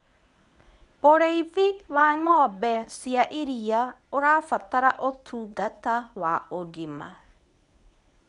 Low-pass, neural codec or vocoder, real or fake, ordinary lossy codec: 10.8 kHz; codec, 24 kHz, 0.9 kbps, WavTokenizer, medium speech release version 1; fake; none